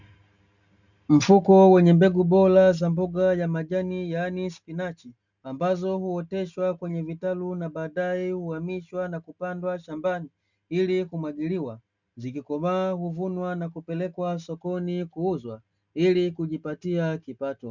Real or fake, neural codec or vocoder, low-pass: real; none; 7.2 kHz